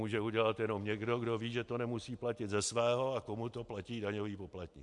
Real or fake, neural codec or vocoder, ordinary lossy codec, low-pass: real; none; MP3, 64 kbps; 10.8 kHz